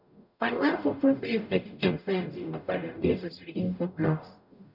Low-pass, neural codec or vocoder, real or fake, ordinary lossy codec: 5.4 kHz; codec, 44.1 kHz, 0.9 kbps, DAC; fake; none